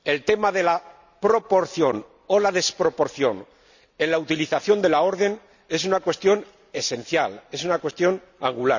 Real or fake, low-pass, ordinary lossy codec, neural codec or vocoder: real; 7.2 kHz; none; none